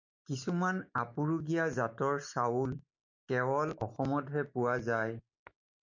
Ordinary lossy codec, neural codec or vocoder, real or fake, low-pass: MP3, 48 kbps; none; real; 7.2 kHz